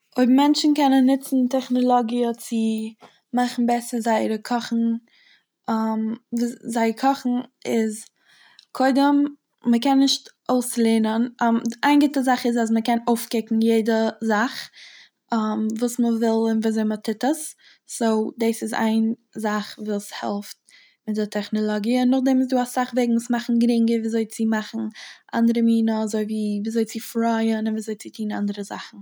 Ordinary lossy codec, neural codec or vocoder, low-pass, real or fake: none; none; none; real